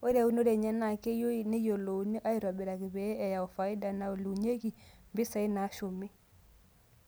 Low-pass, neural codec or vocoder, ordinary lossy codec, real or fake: none; none; none; real